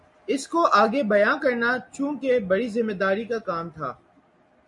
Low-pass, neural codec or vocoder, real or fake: 10.8 kHz; none; real